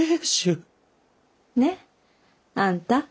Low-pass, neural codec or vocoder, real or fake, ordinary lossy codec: none; none; real; none